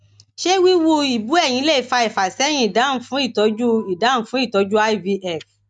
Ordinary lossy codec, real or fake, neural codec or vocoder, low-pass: none; real; none; 9.9 kHz